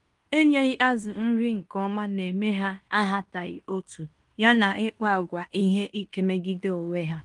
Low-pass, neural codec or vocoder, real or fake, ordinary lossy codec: 10.8 kHz; codec, 16 kHz in and 24 kHz out, 0.9 kbps, LongCat-Audio-Codec, fine tuned four codebook decoder; fake; Opus, 32 kbps